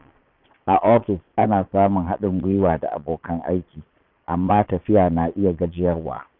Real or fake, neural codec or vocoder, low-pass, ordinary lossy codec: fake; vocoder, 22.05 kHz, 80 mel bands, Vocos; 5.4 kHz; none